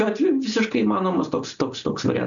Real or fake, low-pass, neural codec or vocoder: real; 7.2 kHz; none